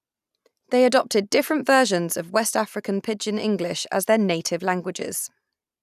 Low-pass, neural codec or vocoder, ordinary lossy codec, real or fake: 14.4 kHz; none; none; real